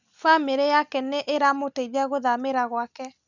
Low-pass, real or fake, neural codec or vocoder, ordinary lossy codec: 7.2 kHz; real; none; none